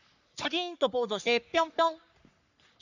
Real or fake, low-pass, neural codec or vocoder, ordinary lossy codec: fake; 7.2 kHz; codec, 44.1 kHz, 3.4 kbps, Pupu-Codec; none